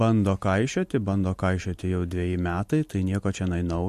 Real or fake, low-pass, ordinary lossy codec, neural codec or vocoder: real; 14.4 kHz; MP3, 64 kbps; none